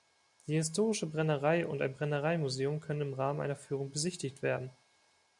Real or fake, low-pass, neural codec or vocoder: real; 10.8 kHz; none